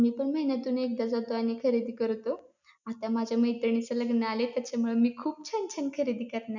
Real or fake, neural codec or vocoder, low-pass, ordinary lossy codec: real; none; 7.2 kHz; none